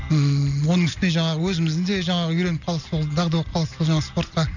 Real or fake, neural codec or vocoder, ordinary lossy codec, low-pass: real; none; none; 7.2 kHz